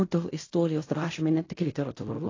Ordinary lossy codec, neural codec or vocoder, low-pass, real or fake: AAC, 32 kbps; codec, 16 kHz in and 24 kHz out, 0.4 kbps, LongCat-Audio-Codec, fine tuned four codebook decoder; 7.2 kHz; fake